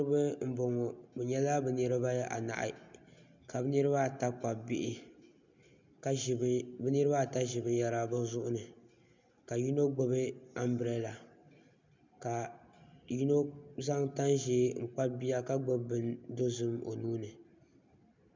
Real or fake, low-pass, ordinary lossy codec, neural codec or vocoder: real; 7.2 kHz; MP3, 64 kbps; none